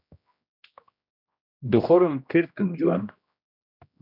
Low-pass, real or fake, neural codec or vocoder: 5.4 kHz; fake; codec, 16 kHz, 1 kbps, X-Codec, HuBERT features, trained on general audio